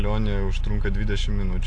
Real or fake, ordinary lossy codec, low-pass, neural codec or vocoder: real; AAC, 48 kbps; 9.9 kHz; none